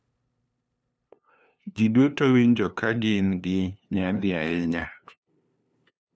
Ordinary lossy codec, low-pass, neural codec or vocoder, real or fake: none; none; codec, 16 kHz, 2 kbps, FunCodec, trained on LibriTTS, 25 frames a second; fake